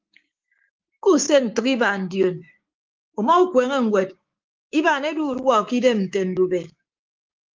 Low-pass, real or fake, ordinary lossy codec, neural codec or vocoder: 7.2 kHz; fake; Opus, 32 kbps; codec, 16 kHz, 6 kbps, DAC